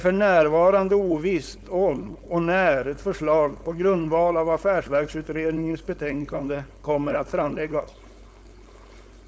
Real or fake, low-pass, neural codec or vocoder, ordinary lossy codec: fake; none; codec, 16 kHz, 4.8 kbps, FACodec; none